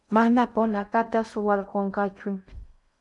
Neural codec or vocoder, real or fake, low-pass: codec, 16 kHz in and 24 kHz out, 0.6 kbps, FocalCodec, streaming, 2048 codes; fake; 10.8 kHz